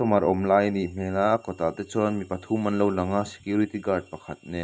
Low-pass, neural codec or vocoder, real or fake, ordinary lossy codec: none; none; real; none